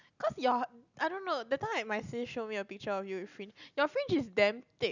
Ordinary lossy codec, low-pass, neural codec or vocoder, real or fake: none; 7.2 kHz; none; real